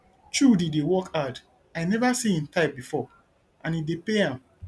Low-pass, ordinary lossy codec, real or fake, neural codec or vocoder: none; none; real; none